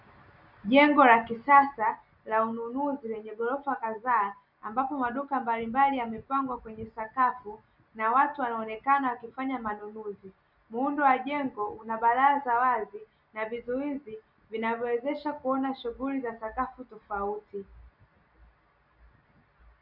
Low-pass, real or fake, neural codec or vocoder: 5.4 kHz; real; none